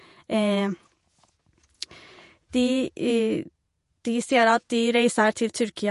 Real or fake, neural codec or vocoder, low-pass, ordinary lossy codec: fake; vocoder, 48 kHz, 128 mel bands, Vocos; 14.4 kHz; MP3, 48 kbps